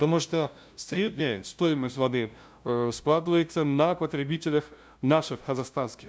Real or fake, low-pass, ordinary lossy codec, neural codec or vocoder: fake; none; none; codec, 16 kHz, 0.5 kbps, FunCodec, trained on LibriTTS, 25 frames a second